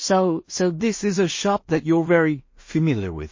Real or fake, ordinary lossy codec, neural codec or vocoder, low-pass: fake; MP3, 32 kbps; codec, 16 kHz in and 24 kHz out, 0.4 kbps, LongCat-Audio-Codec, two codebook decoder; 7.2 kHz